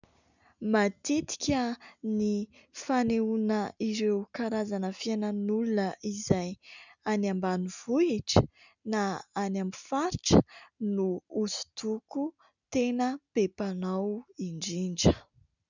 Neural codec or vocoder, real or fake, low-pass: none; real; 7.2 kHz